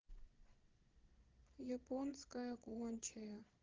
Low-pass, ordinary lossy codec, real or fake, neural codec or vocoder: 7.2 kHz; Opus, 16 kbps; fake; vocoder, 22.05 kHz, 80 mel bands, Vocos